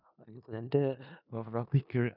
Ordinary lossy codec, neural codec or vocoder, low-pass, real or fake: none; codec, 16 kHz in and 24 kHz out, 0.4 kbps, LongCat-Audio-Codec, four codebook decoder; 5.4 kHz; fake